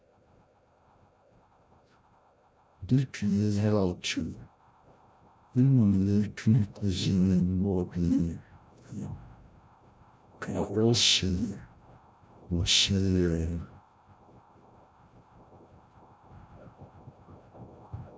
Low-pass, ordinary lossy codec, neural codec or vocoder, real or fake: none; none; codec, 16 kHz, 0.5 kbps, FreqCodec, larger model; fake